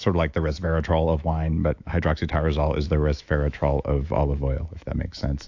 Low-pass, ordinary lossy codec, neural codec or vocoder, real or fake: 7.2 kHz; AAC, 48 kbps; autoencoder, 48 kHz, 128 numbers a frame, DAC-VAE, trained on Japanese speech; fake